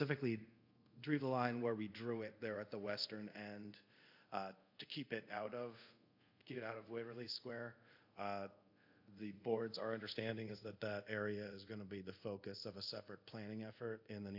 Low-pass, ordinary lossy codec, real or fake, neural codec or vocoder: 5.4 kHz; AAC, 48 kbps; fake; codec, 24 kHz, 0.5 kbps, DualCodec